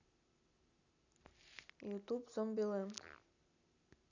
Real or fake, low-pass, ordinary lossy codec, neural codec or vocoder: real; 7.2 kHz; none; none